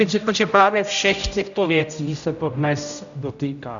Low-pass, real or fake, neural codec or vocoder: 7.2 kHz; fake; codec, 16 kHz, 0.5 kbps, X-Codec, HuBERT features, trained on general audio